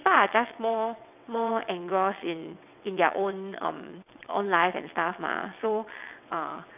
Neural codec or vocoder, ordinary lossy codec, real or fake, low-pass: vocoder, 22.05 kHz, 80 mel bands, WaveNeXt; none; fake; 3.6 kHz